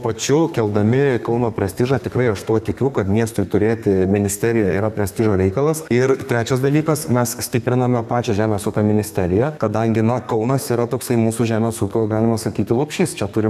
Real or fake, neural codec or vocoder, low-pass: fake; codec, 32 kHz, 1.9 kbps, SNAC; 14.4 kHz